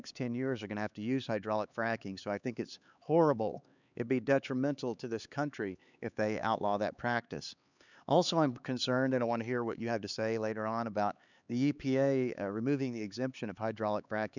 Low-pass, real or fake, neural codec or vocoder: 7.2 kHz; fake; codec, 16 kHz, 4 kbps, X-Codec, HuBERT features, trained on LibriSpeech